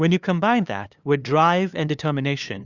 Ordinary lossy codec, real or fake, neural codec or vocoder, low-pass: Opus, 64 kbps; fake; codec, 16 kHz, 2 kbps, FunCodec, trained on LibriTTS, 25 frames a second; 7.2 kHz